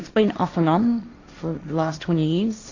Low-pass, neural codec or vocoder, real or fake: 7.2 kHz; codec, 16 kHz, 1.1 kbps, Voila-Tokenizer; fake